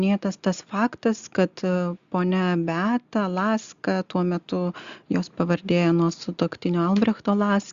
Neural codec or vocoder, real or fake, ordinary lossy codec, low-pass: none; real; Opus, 64 kbps; 7.2 kHz